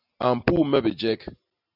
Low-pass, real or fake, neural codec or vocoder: 5.4 kHz; real; none